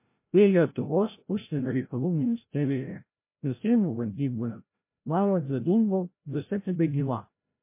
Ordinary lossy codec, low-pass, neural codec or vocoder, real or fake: MP3, 24 kbps; 3.6 kHz; codec, 16 kHz, 0.5 kbps, FreqCodec, larger model; fake